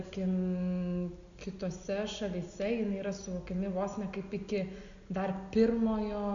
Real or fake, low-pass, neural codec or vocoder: real; 7.2 kHz; none